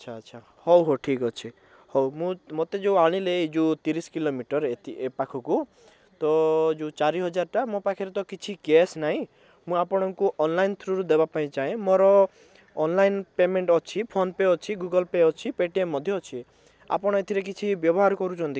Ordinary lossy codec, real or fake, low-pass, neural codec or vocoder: none; real; none; none